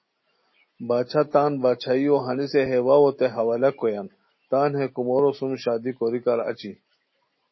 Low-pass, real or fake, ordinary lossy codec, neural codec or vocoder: 7.2 kHz; real; MP3, 24 kbps; none